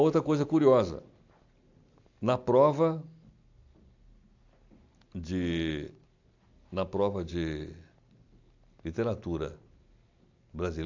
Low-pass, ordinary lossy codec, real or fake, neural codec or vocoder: 7.2 kHz; AAC, 48 kbps; real; none